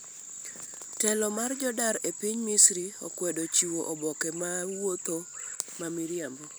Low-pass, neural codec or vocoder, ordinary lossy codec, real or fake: none; none; none; real